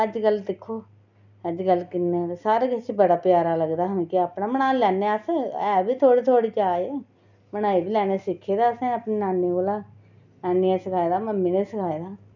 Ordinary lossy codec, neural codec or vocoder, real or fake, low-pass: none; none; real; 7.2 kHz